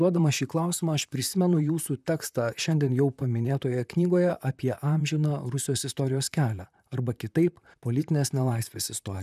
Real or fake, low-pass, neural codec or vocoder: fake; 14.4 kHz; vocoder, 44.1 kHz, 128 mel bands, Pupu-Vocoder